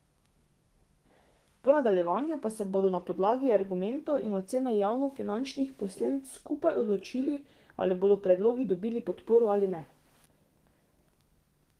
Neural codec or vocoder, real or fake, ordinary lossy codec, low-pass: codec, 32 kHz, 1.9 kbps, SNAC; fake; Opus, 24 kbps; 14.4 kHz